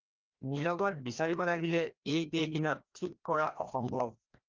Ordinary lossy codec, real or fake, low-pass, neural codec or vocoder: Opus, 24 kbps; fake; 7.2 kHz; codec, 16 kHz, 1 kbps, FreqCodec, larger model